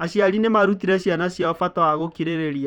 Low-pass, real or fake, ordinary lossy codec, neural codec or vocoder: 19.8 kHz; fake; none; vocoder, 44.1 kHz, 128 mel bands, Pupu-Vocoder